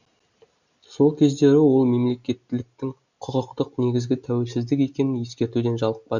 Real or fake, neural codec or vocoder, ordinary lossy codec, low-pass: real; none; none; 7.2 kHz